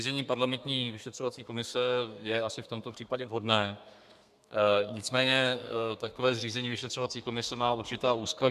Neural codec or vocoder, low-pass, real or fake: codec, 32 kHz, 1.9 kbps, SNAC; 14.4 kHz; fake